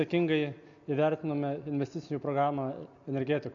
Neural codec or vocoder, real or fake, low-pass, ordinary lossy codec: none; real; 7.2 kHz; AAC, 64 kbps